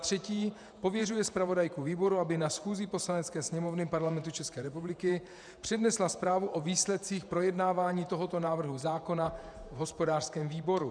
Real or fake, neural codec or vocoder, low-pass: fake; vocoder, 48 kHz, 128 mel bands, Vocos; 9.9 kHz